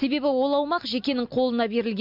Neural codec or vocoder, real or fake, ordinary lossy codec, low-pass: none; real; MP3, 48 kbps; 5.4 kHz